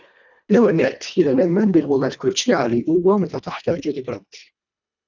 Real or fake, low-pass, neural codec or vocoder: fake; 7.2 kHz; codec, 24 kHz, 1.5 kbps, HILCodec